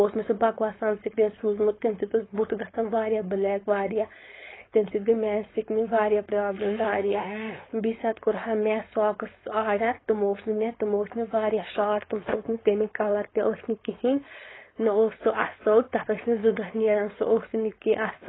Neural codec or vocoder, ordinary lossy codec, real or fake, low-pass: codec, 16 kHz, 4.8 kbps, FACodec; AAC, 16 kbps; fake; 7.2 kHz